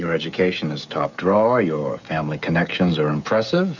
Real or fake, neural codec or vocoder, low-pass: fake; codec, 16 kHz, 16 kbps, FreqCodec, smaller model; 7.2 kHz